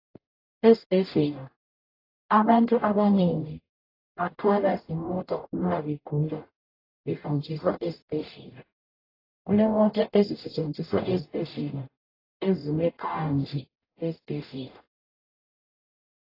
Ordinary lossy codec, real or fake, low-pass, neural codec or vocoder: AAC, 24 kbps; fake; 5.4 kHz; codec, 44.1 kHz, 0.9 kbps, DAC